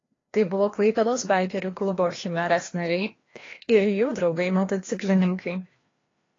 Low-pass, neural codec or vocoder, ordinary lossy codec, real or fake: 7.2 kHz; codec, 16 kHz, 1 kbps, FreqCodec, larger model; AAC, 32 kbps; fake